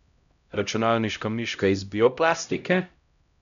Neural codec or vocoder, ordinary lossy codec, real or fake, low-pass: codec, 16 kHz, 0.5 kbps, X-Codec, HuBERT features, trained on LibriSpeech; none; fake; 7.2 kHz